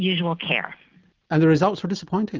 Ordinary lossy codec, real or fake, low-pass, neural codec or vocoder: Opus, 32 kbps; real; 7.2 kHz; none